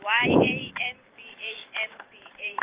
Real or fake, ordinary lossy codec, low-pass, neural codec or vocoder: fake; Opus, 24 kbps; 3.6 kHz; vocoder, 44.1 kHz, 128 mel bands every 512 samples, BigVGAN v2